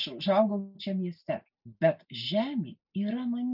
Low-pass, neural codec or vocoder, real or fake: 5.4 kHz; none; real